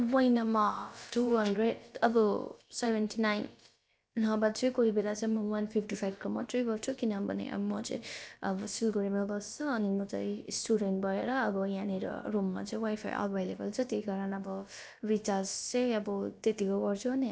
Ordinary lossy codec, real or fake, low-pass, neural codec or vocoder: none; fake; none; codec, 16 kHz, about 1 kbps, DyCAST, with the encoder's durations